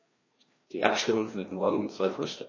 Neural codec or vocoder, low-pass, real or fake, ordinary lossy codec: codec, 16 kHz, 1 kbps, FreqCodec, larger model; 7.2 kHz; fake; MP3, 32 kbps